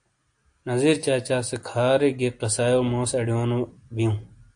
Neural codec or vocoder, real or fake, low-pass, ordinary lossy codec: none; real; 9.9 kHz; AAC, 64 kbps